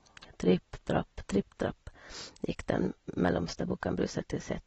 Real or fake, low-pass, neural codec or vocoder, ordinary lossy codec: real; 19.8 kHz; none; AAC, 24 kbps